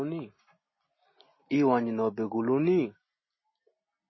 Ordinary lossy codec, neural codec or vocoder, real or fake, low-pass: MP3, 24 kbps; none; real; 7.2 kHz